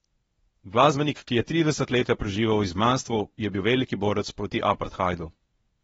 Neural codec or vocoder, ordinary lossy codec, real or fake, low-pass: codec, 24 kHz, 0.9 kbps, WavTokenizer, medium speech release version 1; AAC, 24 kbps; fake; 10.8 kHz